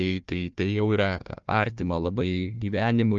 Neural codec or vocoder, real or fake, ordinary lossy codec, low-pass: codec, 16 kHz, 1 kbps, FunCodec, trained on Chinese and English, 50 frames a second; fake; Opus, 24 kbps; 7.2 kHz